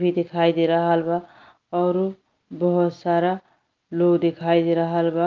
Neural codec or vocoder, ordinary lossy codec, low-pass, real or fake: none; Opus, 24 kbps; 7.2 kHz; real